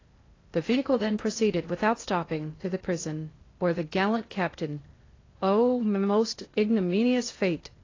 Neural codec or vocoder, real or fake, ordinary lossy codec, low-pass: codec, 16 kHz in and 24 kHz out, 0.6 kbps, FocalCodec, streaming, 2048 codes; fake; AAC, 32 kbps; 7.2 kHz